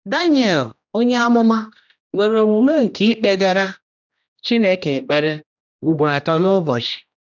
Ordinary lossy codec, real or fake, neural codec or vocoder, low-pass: none; fake; codec, 16 kHz, 1 kbps, X-Codec, HuBERT features, trained on general audio; 7.2 kHz